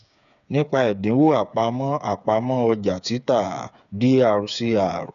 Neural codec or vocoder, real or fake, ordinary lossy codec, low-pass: codec, 16 kHz, 8 kbps, FreqCodec, smaller model; fake; none; 7.2 kHz